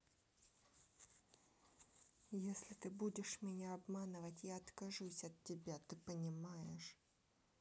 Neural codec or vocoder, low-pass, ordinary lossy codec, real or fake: none; none; none; real